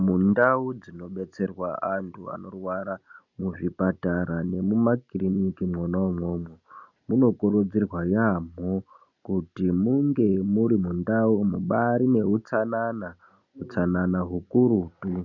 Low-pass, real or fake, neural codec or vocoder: 7.2 kHz; real; none